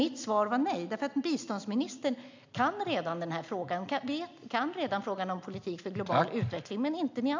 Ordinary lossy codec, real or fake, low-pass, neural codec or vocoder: MP3, 64 kbps; real; 7.2 kHz; none